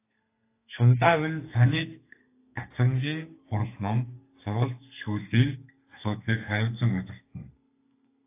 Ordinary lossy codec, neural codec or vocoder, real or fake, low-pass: AAC, 24 kbps; codec, 32 kHz, 1.9 kbps, SNAC; fake; 3.6 kHz